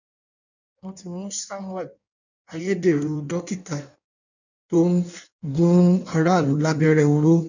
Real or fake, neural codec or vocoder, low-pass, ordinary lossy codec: fake; codec, 16 kHz in and 24 kHz out, 1.1 kbps, FireRedTTS-2 codec; 7.2 kHz; none